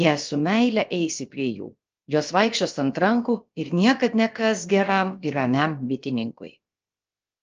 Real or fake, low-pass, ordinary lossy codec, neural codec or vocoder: fake; 7.2 kHz; Opus, 24 kbps; codec, 16 kHz, about 1 kbps, DyCAST, with the encoder's durations